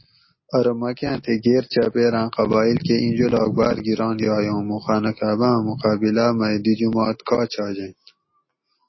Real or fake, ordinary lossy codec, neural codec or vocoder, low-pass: real; MP3, 24 kbps; none; 7.2 kHz